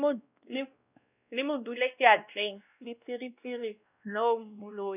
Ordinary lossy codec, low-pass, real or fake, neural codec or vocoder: none; 3.6 kHz; fake; codec, 16 kHz, 1 kbps, X-Codec, WavLM features, trained on Multilingual LibriSpeech